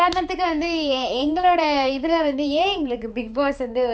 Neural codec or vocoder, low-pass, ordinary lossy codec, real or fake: codec, 16 kHz, 4 kbps, X-Codec, HuBERT features, trained on balanced general audio; none; none; fake